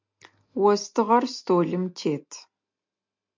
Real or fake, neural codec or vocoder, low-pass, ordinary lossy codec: real; none; 7.2 kHz; MP3, 64 kbps